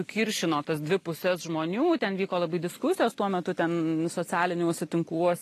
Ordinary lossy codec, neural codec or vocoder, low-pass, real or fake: AAC, 48 kbps; none; 14.4 kHz; real